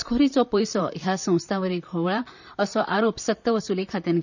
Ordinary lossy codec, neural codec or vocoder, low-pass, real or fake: none; vocoder, 44.1 kHz, 128 mel bands, Pupu-Vocoder; 7.2 kHz; fake